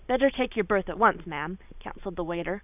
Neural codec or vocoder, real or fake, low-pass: none; real; 3.6 kHz